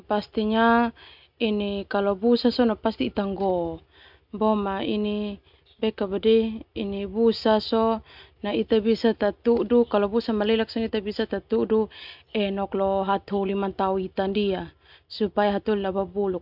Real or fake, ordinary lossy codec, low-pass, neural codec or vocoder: real; MP3, 48 kbps; 5.4 kHz; none